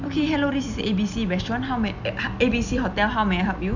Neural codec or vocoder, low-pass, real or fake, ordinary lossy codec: none; 7.2 kHz; real; none